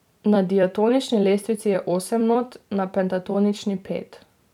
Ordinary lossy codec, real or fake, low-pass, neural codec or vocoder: none; fake; 19.8 kHz; vocoder, 44.1 kHz, 128 mel bands every 256 samples, BigVGAN v2